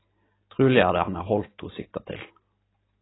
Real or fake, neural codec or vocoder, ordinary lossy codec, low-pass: real; none; AAC, 16 kbps; 7.2 kHz